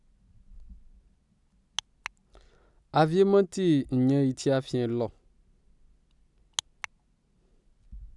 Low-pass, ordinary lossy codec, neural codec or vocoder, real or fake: 10.8 kHz; none; none; real